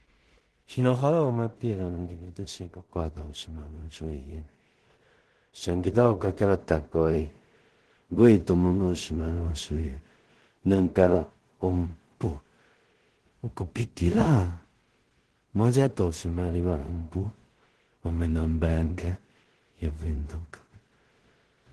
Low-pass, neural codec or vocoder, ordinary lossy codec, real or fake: 10.8 kHz; codec, 16 kHz in and 24 kHz out, 0.4 kbps, LongCat-Audio-Codec, two codebook decoder; Opus, 16 kbps; fake